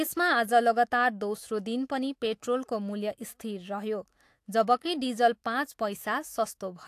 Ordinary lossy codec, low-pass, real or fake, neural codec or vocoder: AAC, 64 kbps; 14.4 kHz; fake; autoencoder, 48 kHz, 128 numbers a frame, DAC-VAE, trained on Japanese speech